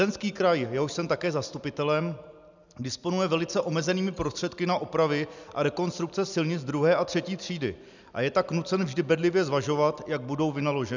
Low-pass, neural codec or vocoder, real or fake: 7.2 kHz; none; real